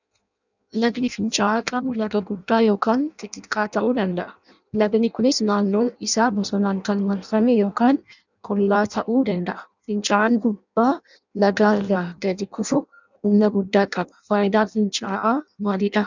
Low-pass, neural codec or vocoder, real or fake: 7.2 kHz; codec, 16 kHz in and 24 kHz out, 0.6 kbps, FireRedTTS-2 codec; fake